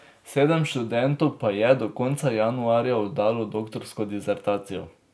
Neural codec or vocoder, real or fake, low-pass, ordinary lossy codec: none; real; none; none